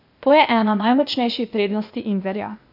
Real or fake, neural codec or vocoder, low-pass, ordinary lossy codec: fake; codec, 16 kHz, 0.8 kbps, ZipCodec; 5.4 kHz; none